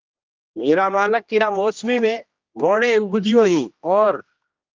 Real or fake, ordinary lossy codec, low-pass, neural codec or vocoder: fake; Opus, 24 kbps; 7.2 kHz; codec, 16 kHz, 1 kbps, X-Codec, HuBERT features, trained on general audio